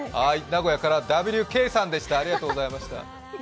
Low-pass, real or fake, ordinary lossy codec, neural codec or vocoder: none; real; none; none